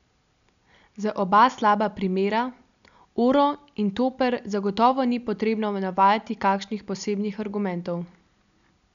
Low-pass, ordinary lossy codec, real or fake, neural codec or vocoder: 7.2 kHz; MP3, 96 kbps; real; none